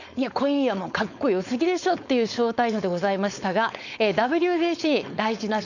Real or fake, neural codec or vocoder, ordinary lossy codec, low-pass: fake; codec, 16 kHz, 4.8 kbps, FACodec; none; 7.2 kHz